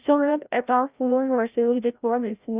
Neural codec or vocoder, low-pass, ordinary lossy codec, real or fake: codec, 16 kHz, 0.5 kbps, FreqCodec, larger model; 3.6 kHz; Opus, 64 kbps; fake